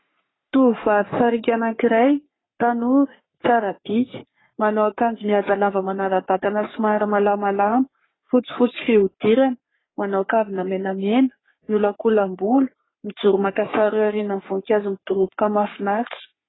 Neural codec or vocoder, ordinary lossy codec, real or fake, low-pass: codec, 44.1 kHz, 3.4 kbps, Pupu-Codec; AAC, 16 kbps; fake; 7.2 kHz